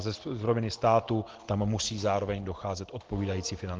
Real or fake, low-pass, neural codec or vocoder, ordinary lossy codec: real; 7.2 kHz; none; Opus, 16 kbps